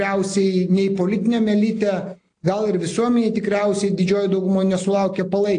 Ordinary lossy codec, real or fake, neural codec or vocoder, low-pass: AAC, 48 kbps; real; none; 9.9 kHz